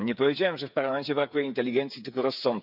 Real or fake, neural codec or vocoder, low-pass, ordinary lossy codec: fake; codec, 16 kHz, 8 kbps, FreqCodec, smaller model; 5.4 kHz; none